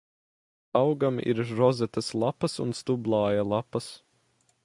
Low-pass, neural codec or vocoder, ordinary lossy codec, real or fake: 10.8 kHz; none; MP3, 96 kbps; real